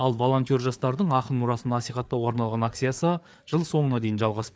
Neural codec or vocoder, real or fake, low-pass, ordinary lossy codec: codec, 16 kHz, 4 kbps, FreqCodec, larger model; fake; none; none